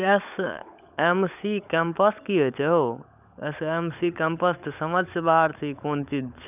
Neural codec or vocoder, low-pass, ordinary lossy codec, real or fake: codec, 16 kHz, 16 kbps, FunCodec, trained on LibriTTS, 50 frames a second; 3.6 kHz; none; fake